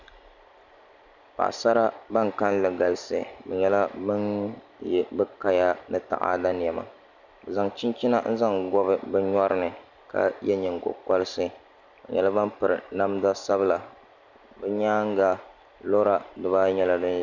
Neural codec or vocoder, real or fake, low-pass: none; real; 7.2 kHz